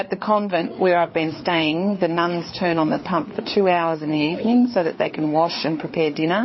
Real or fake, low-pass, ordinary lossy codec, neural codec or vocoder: fake; 7.2 kHz; MP3, 24 kbps; codec, 16 kHz, 4 kbps, FreqCodec, larger model